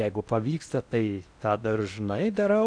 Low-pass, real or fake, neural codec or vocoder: 9.9 kHz; fake; codec, 16 kHz in and 24 kHz out, 0.6 kbps, FocalCodec, streaming, 4096 codes